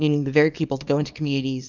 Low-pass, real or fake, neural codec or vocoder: 7.2 kHz; fake; codec, 24 kHz, 0.9 kbps, WavTokenizer, small release